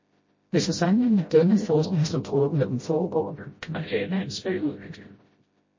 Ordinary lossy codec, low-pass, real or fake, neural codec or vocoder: MP3, 32 kbps; 7.2 kHz; fake; codec, 16 kHz, 0.5 kbps, FreqCodec, smaller model